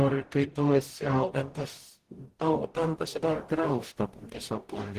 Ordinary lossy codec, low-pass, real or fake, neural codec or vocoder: Opus, 16 kbps; 14.4 kHz; fake; codec, 44.1 kHz, 0.9 kbps, DAC